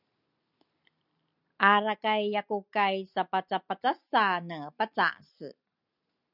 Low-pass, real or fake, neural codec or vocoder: 5.4 kHz; real; none